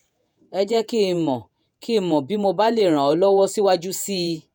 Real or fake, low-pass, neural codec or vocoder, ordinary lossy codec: fake; none; vocoder, 48 kHz, 128 mel bands, Vocos; none